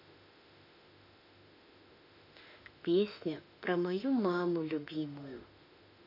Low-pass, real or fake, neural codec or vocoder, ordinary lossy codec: 5.4 kHz; fake; autoencoder, 48 kHz, 32 numbers a frame, DAC-VAE, trained on Japanese speech; none